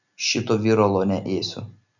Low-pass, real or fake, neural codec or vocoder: 7.2 kHz; real; none